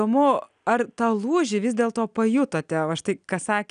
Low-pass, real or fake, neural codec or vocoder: 9.9 kHz; real; none